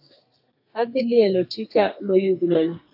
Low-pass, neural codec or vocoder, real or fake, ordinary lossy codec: 5.4 kHz; codec, 32 kHz, 1.9 kbps, SNAC; fake; AAC, 48 kbps